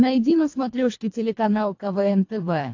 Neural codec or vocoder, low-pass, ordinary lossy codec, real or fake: codec, 24 kHz, 1.5 kbps, HILCodec; 7.2 kHz; AAC, 48 kbps; fake